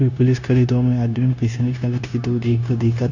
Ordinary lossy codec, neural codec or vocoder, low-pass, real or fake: none; codec, 16 kHz, 0.9 kbps, LongCat-Audio-Codec; 7.2 kHz; fake